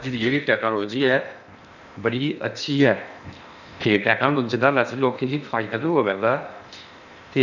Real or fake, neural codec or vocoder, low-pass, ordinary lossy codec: fake; codec, 16 kHz in and 24 kHz out, 0.8 kbps, FocalCodec, streaming, 65536 codes; 7.2 kHz; none